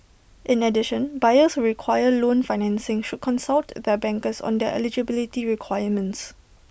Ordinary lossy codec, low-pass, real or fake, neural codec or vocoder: none; none; real; none